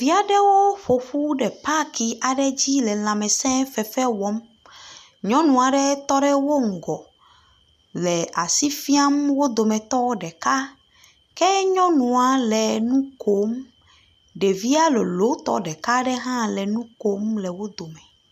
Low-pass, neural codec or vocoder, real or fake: 14.4 kHz; none; real